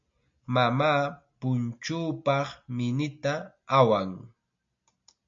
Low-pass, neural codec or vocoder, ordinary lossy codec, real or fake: 7.2 kHz; none; MP3, 48 kbps; real